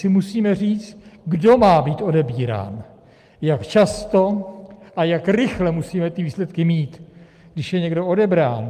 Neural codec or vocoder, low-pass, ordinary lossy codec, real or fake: none; 14.4 kHz; Opus, 32 kbps; real